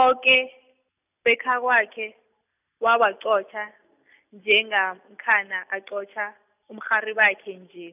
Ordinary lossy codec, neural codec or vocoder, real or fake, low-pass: none; none; real; 3.6 kHz